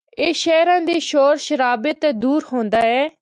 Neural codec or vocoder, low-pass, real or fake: autoencoder, 48 kHz, 128 numbers a frame, DAC-VAE, trained on Japanese speech; 10.8 kHz; fake